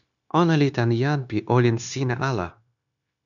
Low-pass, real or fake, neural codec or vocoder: 7.2 kHz; fake; codec, 16 kHz, 0.9 kbps, LongCat-Audio-Codec